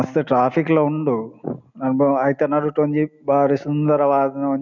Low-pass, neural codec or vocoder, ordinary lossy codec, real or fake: 7.2 kHz; none; none; real